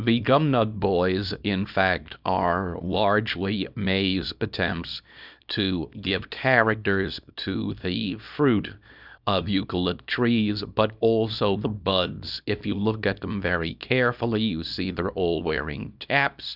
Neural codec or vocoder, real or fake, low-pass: codec, 24 kHz, 0.9 kbps, WavTokenizer, small release; fake; 5.4 kHz